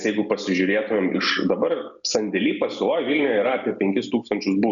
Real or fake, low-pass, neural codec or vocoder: real; 7.2 kHz; none